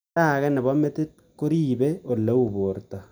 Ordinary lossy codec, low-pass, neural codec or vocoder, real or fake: none; none; none; real